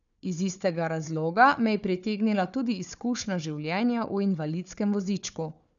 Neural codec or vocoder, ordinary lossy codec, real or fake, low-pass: codec, 16 kHz, 4 kbps, FunCodec, trained on Chinese and English, 50 frames a second; none; fake; 7.2 kHz